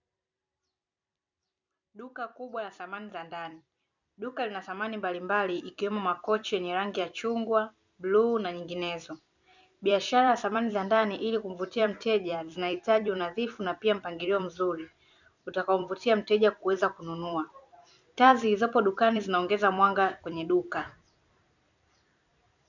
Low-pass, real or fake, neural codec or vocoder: 7.2 kHz; fake; vocoder, 44.1 kHz, 128 mel bands every 256 samples, BigVGAN v2